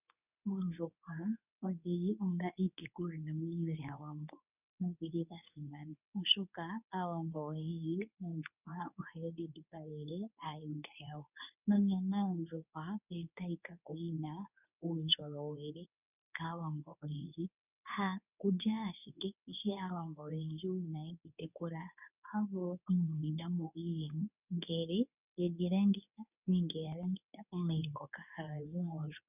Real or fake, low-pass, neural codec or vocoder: fake; 3.6 kHz; codec, 24 kHz, 0.9 kbps, WavTokenizer, medium speech release version 2